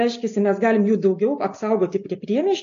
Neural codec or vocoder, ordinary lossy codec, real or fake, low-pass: none; AAC, 48 kbps; real; 7.2 kHz